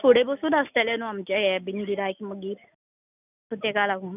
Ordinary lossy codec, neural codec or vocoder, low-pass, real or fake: none; none; 3.6 kHz; real